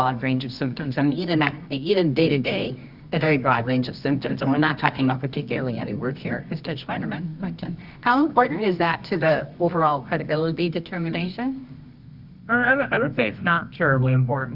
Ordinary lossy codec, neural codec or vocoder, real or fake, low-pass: Opus, 64 kbps; codec, 24 kHz, 0.9 kbps, WavTokenizer, medium music audio release; fake; 5.4 kHz